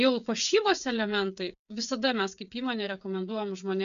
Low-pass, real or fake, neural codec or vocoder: 7.2 kHz; fake; codec, 16 kHz, 8 kbps, FreqCodec, smaller model